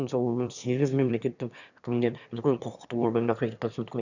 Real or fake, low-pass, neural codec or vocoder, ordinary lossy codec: fake; 7.2 kHz; autoencoder, 22.05 kHz, a latent of 192 numbers a frame, VITS, trained on one speaker; none